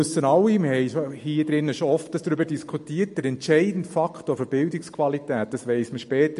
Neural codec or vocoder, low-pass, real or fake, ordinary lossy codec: none; 14.4 kHz; real; MP3, 48 kbps